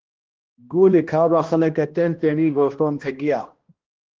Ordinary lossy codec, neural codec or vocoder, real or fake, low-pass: Opus, 16 kbps; codec, 16 kHz, 1 kbps, X-Codec, HuBERT features, trained on balanced general audio; fake; 7.2 kHz